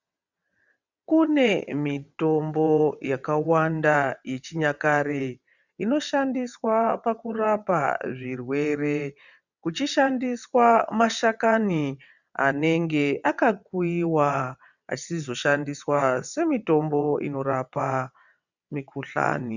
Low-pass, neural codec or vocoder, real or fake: 7.2 kHz; vocoder, 22.05 kHz, 80 mel bands, WaveNeXt; fake